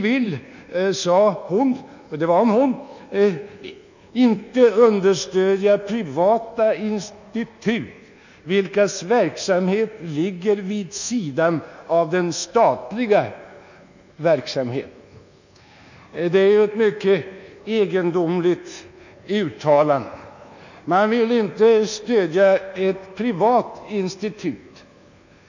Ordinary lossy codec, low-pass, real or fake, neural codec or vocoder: none; 7.2 kHz; fake; codec, 24 kHz, 1.2 kbps, DualCodec